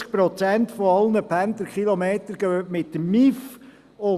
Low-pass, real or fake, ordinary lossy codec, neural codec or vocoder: 14.4 kHz; real; Opus, 64 kbps; none